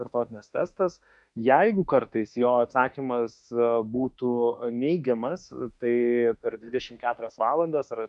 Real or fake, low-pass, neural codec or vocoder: fake; 10.8 kHz; autoencoder, 48 kHz, 32 numbers a frame, DAC-VAE, trained on Japanese speech